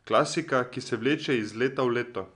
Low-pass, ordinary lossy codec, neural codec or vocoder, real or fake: 10.8 kHz; none; none; real